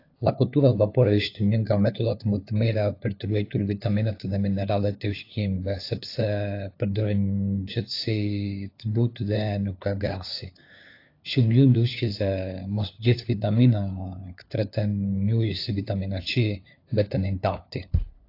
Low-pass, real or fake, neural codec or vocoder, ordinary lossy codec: 5.4 kHz; fake; codec, 16 kHz, 4 kbps, FunCodec, trained on LibriTTS, 50 frames a second; AAC, 32 kbps